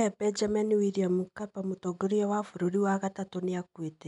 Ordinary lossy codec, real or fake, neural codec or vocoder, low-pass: none; real; none; 10.8 kHz